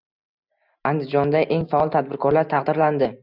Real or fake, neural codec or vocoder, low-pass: real; none; 5.4 kHz